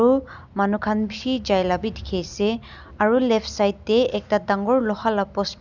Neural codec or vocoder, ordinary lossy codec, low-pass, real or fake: none; none; 7.2 kHz; real